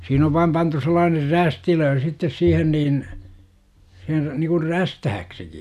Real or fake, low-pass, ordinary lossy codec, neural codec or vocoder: real; 14.4 kHz; none; none